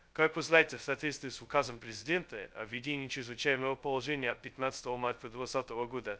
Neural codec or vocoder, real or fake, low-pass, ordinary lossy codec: codec, 16 kHz, 0.2 kbps, FocalCodec; fake; none; none